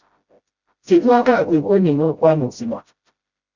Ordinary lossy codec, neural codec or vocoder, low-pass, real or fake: Opus, 64 kbps; codec, 16 kHz, 0.5 kbps, FreqCodec, smaller model; 7.2 kHz; fake